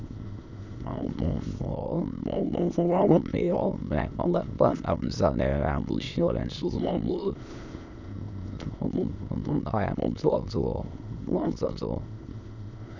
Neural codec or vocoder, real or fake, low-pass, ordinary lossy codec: autoencoder, 22.05 kHz, a latent of 192 numbers a frame, VITS, trained on many speakers; fake; 7.2 kHz; none